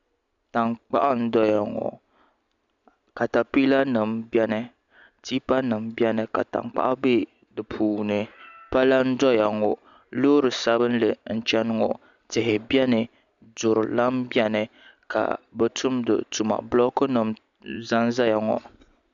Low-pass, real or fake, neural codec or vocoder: 7.2 kHz; real; none